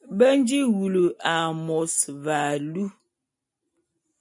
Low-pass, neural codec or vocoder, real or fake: 10.8 kHz; none; real